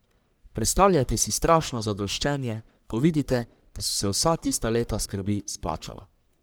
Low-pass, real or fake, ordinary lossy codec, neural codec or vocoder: none; fake; none; codec, 44.1 kHz, 1.7 kbps, Pupu-Codec